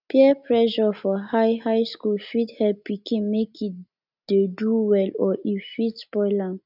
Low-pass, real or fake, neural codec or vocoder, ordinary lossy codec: 5.4 kHz; real; none; none